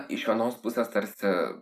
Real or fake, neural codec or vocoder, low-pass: real; none; 14.4 kHz